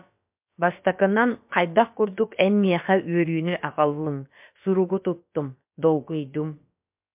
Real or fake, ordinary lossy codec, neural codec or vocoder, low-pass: fake; MP3, 32 kbps; codec, 16 kHz, about 1 kbps, DyCAST, with the encoder's durations; 3.6 kHz